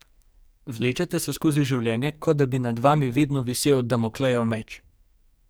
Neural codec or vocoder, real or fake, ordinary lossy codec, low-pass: codec, 44.1 kHz, 2.6 kbps, SNAC; fake; none; none